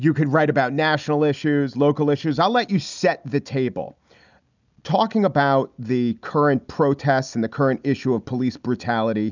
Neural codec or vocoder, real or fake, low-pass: none; real; 7.2 kHz